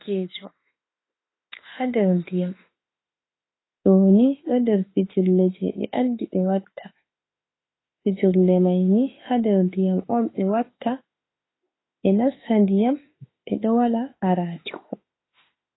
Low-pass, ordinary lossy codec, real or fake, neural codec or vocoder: 7.2 kHz; AAC, 16 kbps; fake; autoencoder, 48 kHz, 32 numbers a frame, DAC-VAE, trained on Japanese speech